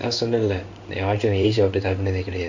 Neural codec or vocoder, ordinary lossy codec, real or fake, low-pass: codec, 16 kHz in and 24 kHz out, 1 kbps, XY-Tokenizer; Opus, 64 kbps; fake; 7.2 kHz